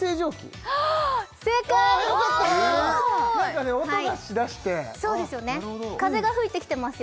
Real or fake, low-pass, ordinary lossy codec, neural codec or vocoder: real; none; none; none